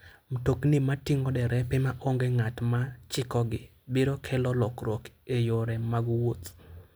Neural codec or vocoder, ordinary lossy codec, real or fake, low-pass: none; none; real; none